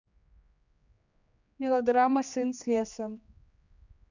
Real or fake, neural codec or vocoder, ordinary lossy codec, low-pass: fake; codec, 16 kHz, 2 kbps, X-Codec, HuBERT features, trained on general audio; none; 7.2 kHz